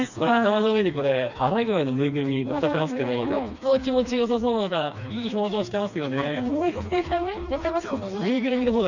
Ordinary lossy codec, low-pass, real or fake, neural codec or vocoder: none; 7.2 kHz; fake; codec, 16 kHz, 2 kbps, FreqCodec, smaller model